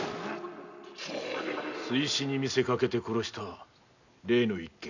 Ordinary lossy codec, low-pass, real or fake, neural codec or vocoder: none; 7.2 kHz; real; none